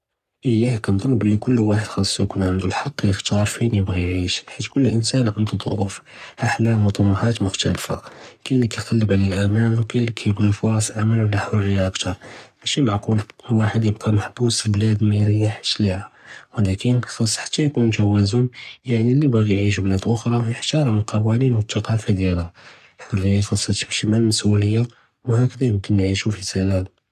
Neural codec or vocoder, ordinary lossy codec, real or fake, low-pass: codec, 44.1 kHz, 3.4 kbps, Pupu-Codec; none; fake; 14.4 kHz